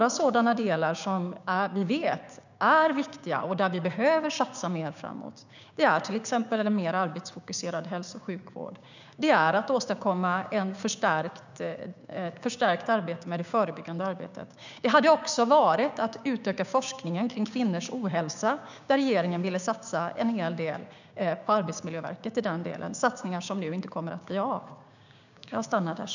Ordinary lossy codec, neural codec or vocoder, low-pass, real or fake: none; codec, 16 kHz, 6 kbps, DAC; 7.2 kHz; fake